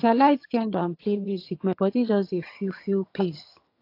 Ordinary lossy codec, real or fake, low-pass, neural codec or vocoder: AAC, 32 kbps; fake; 5.4 kHz; vocoder, 22.05 kHz, 80 mel bands, HiFi-GAN